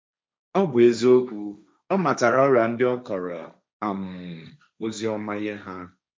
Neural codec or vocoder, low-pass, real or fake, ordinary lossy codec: codec, 16 kHz, 1.1 kbps, Voila-Tokenizer; none; fake; none